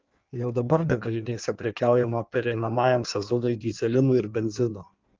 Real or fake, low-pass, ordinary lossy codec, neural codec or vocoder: fake; 7.2 kHz; Opus, 32 kbps; codec, 16 kHz in and 24 kHz out, 1.1 kbps, FireRedTTS-2 codec